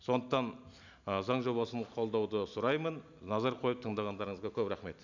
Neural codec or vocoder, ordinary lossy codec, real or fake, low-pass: none; none; real; 7.2 kHz